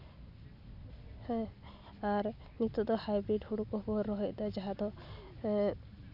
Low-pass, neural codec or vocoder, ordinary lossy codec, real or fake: 5.4 kHz; none; none; real